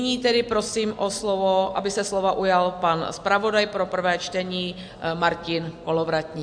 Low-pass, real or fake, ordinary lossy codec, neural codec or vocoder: 9.9 kHz; real; AAC, 64 kbps; none